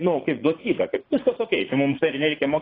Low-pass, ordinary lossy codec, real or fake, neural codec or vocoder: 5.4 kHz; AAC, 24 kbps; fake; vocoder, 44.1 kHz, 80 mel bands, Vocos